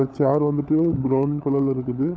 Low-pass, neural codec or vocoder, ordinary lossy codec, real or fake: none; codec, 16 kHz, 16 kbps, FunCodec, trained on LibriTTS, 50 frames a second; none; fake